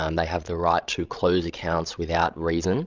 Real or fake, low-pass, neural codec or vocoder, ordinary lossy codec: fake; 7.2 kHz; codec, 16 kHz, 16 kbps, FreqCodec, larger model; Opus, 24 kbps